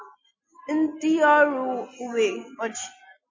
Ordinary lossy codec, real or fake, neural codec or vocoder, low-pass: MP3, 32 kbps; real; none; 7.2 kHz